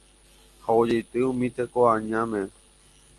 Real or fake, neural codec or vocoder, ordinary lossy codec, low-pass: real; none; Opus, 24 kbps; 10.8 kHz